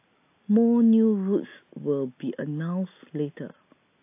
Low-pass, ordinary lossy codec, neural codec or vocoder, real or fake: 3.6 kHz; AAC, 24 kbps; none; real